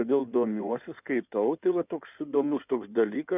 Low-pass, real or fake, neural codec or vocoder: 3.6 kHz; fake; codec, 16 kHz in and 24 kHz out, 2.2 kbps, FireRedTTS-2 codec